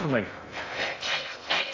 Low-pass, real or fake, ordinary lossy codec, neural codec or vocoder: 7.2 kHz; fake; AAC, 48 kbps; codec, 16 kHz in and 24 kHz out, 0.6 kbps, FocalCodec, streaming, 2048 codes